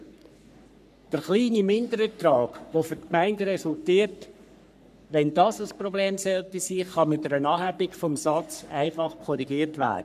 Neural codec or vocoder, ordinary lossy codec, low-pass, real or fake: codec, 44.1 kHz, 3.4 kbps, Pupu-Codec; none; 14.4 kHz; fake